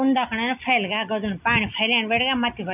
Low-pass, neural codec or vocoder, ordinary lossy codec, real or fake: 3.6 kHz; none; none; real